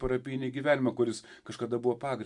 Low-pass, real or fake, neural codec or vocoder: 10.8 kHz; real; none